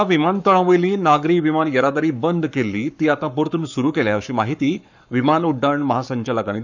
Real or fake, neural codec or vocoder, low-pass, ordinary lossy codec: fake; codec, 44.1 kHz, 7.8 kbps, Pupu-Codec; 7.2 kHz; none